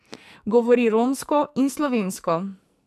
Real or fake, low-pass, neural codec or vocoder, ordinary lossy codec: fake; 14.4 kHz; codec, 44.1 kHz, 2.6 kbps, SNAC; none